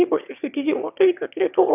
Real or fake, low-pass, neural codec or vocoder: fake; 3.6 kHz; autoencoder, 22.05 kHz, a latent of 192 numbers a frame, VITS, trained on one speaker